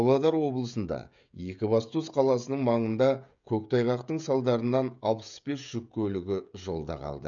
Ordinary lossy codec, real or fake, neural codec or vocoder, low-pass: none; fake; codec, 16 kHz, 16 kbps, FreqCodec, smaller model; 7.2 kHz